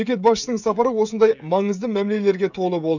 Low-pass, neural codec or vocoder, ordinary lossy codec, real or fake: 7.2 kHz; codec, 16 kHz, 16 kbps, FreqCodec, smaller model; none; fake